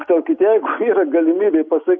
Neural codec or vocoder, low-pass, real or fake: none; 7.2 kHz; real